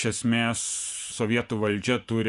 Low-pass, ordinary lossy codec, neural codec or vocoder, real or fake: 10.8 kHz; Opus, 64 kbps; vocoder, 24 kHz, 100 mel bands, Vocos; fake